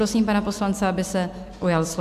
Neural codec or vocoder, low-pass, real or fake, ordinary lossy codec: none; 14.4 kHz; real; MP3, 96 kbps